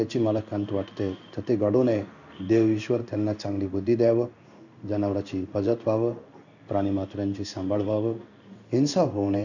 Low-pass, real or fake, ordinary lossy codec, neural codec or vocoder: 7.2 kHz; fake; none; codec, 16 kHz in and 24 kHz out, 1 kbps, XY-Tokenizer